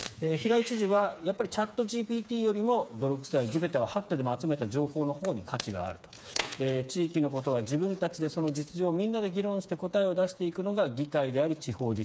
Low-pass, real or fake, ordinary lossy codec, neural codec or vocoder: none; fake; none; codec, 16 kHz, 4 kbps, FreqCodec, smaller model